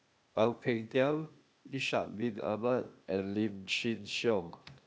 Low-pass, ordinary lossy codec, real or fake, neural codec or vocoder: none; none; fake; codec, 16 kHz, 0.8 kbps, ZipCodec